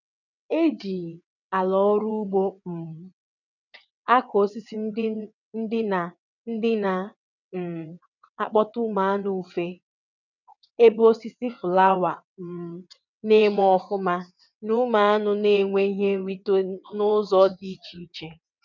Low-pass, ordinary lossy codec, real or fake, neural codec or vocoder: 7.2 kHz; none; fake; vocoder, 22.05 kHz, 80 mel bands, WaveNeXt